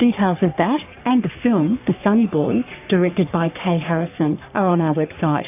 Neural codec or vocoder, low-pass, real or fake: codec, 44.1 kHz, 3.4 kbps, Pupu-Codec; 3.6 kHz; fake